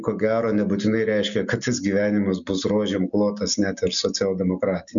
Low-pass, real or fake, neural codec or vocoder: 7.2 kHz; real; none